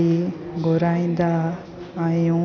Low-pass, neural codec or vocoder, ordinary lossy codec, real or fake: 7.2 kHz; none; none; real